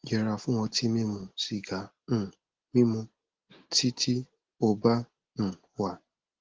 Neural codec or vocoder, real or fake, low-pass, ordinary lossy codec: none; real; 7.2 kHz; Opus, 16 kbps